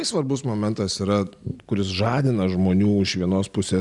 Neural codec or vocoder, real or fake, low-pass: none; real; 10.8 kHz